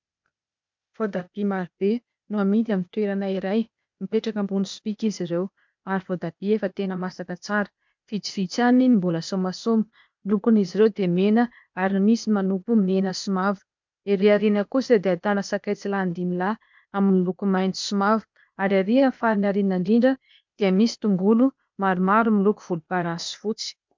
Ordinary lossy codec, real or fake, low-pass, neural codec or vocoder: MP3, 64 kbps; fake; 7.2 kHz; codec, 16 kHz, 0.8 kbps, ZipCodec